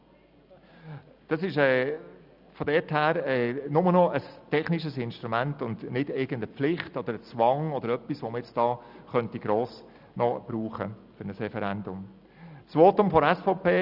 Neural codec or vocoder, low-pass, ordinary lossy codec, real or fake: none; 5.4 kHz; Opus, 64 kbps; real